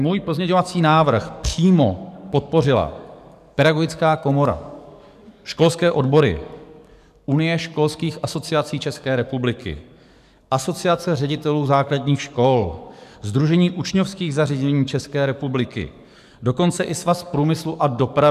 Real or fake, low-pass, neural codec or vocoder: fake; 14.4 kHz; codec, 44.1 kHz, 7.8 kbps, DAC